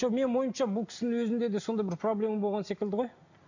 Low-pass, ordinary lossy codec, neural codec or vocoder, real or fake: 7.2 kHz; none; none; real